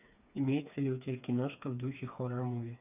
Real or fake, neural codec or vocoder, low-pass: fake; codec, 16 kHz, 4 kbps, FreqCodec, smaller model; 3.6 kHz